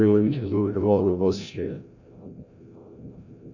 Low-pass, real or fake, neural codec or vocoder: 7.2 kHz; fake; codec, 16 kHz, 0.5 kbps, FreqCodec, larger model